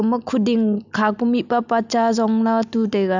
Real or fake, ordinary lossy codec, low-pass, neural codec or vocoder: real; none; 7.2 kHz; none